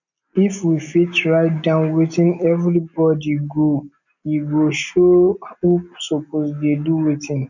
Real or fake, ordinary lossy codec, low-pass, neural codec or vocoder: real; none; 7.2 kHz; none